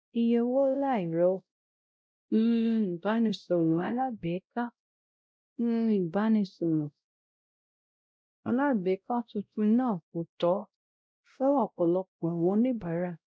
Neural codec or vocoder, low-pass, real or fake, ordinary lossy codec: codec, 16 kHz, 0.5 kbps, X-Codec, WavLM features, trained on Multilingual LibriSpeech; none; fake; none